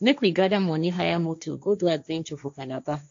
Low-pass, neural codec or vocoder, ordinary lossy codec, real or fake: 7.2 kHz; codec, 16 kHz, 1.1 kbps, Voila-Tokenizer; AAC, 64 kbps; fake